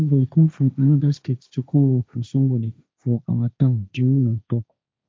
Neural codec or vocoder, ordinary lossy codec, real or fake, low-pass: codec, 16 kHz, 1.1 kbps, Voila-Tokenizer; none; fake; none